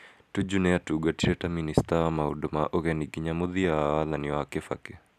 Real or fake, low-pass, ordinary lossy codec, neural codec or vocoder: real; 14.4 kHz; none; none